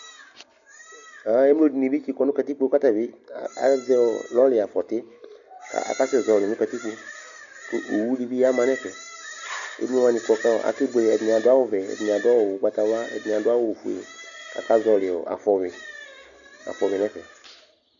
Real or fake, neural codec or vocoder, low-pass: real; none; 7.2 kHz